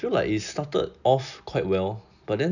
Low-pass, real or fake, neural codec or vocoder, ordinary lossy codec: 7.2 kHz; real; none; none